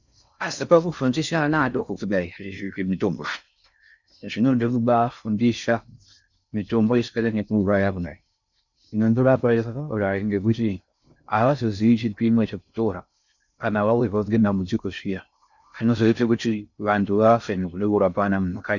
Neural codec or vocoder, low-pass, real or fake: codec, 16 kHz in and 24 kHz out, 0.6 kbps, FocalCodec, streaming, 4096 codes; 7.2 kHz; fake